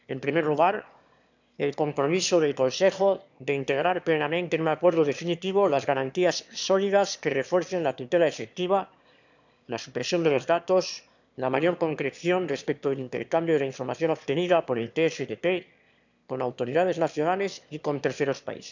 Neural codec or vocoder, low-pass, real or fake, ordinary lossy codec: autoencoder, 22.05 kHz, a latent of 192 numbers a frame, VITS, trained on one speaker; 7.2 kHz; fake; none